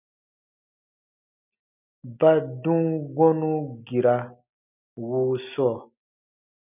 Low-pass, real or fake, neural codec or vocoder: 3.6 kHz; real; none